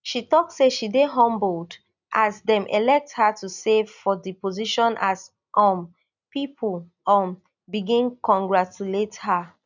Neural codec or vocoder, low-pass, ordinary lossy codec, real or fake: none; 7.2 kHz; none; real